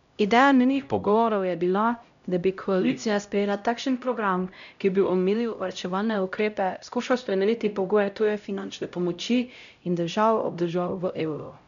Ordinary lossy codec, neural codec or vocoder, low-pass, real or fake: none; codec, 16 kHz, 0.5 kbps, X-Codec, HuBERT features, trained on LibriSpeech; 7.2 kHz; fake